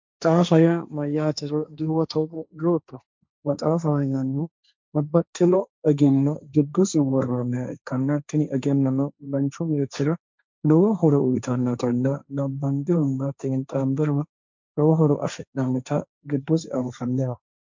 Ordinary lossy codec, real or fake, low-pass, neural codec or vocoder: MP3, 64 kbps; fake; 7.2 kHz; codec, 16 kHz, 1.1 kbps, Voila-Tokenizer